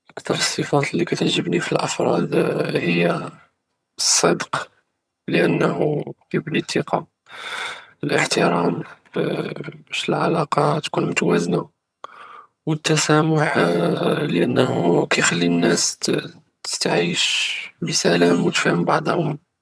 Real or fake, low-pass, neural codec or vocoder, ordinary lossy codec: fake; none; vocoder, 22.05 kHz, 80 mel bands, HiFi-GAN; none